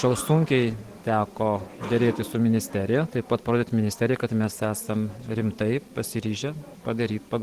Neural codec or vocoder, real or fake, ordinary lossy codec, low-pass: none; real; Opus, 16 kbps; 14.4 kHz